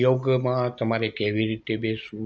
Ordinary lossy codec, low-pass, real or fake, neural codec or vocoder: none; none; real; none